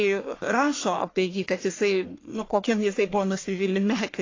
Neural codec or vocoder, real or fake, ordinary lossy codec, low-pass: codec, 44.1 kHz, 1.7 kbps, Pupu-Codec; fake; AAC, 32 kbps; 7.2 kHz